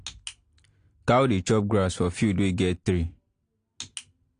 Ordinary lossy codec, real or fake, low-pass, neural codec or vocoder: AAC, 48 kbps; real; 9.9 kHz; none